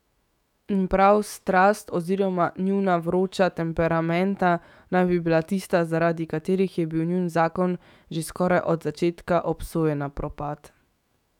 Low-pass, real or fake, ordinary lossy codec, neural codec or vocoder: 19.8 kHz; fake; none; autoencoder, 48 kHz, 128 numbers a frame, DAC-VAE, trained on Japanese speech